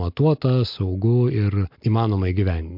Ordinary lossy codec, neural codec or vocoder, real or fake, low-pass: MP3, 48 kbps; none; real; 5.4 kHz